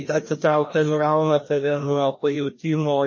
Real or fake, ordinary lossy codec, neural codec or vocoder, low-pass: fake; MP3, 32 kbps; codec, 16 kHz, 1 kbps, FreqCodec, larger model; 7.2 kHz